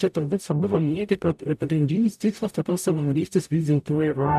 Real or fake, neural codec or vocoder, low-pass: fake; codec, 44.1 kHz, 0.9 kbps, DAC; 14.4 kHz